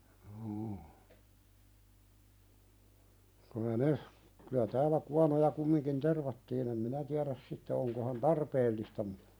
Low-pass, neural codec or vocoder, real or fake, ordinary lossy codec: none; vocoder, 44.1 kHz, 128 mel bands every 512 samples, BigVGAN v2; fake; none